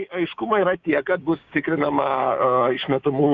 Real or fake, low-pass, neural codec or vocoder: fake; 7.2 kHz; codec, 16 kHz, 6 kbps, DAC